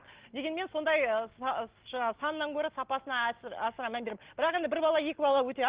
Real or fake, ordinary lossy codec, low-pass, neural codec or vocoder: real; Opus, 16 kbps; 3.6 kHz; none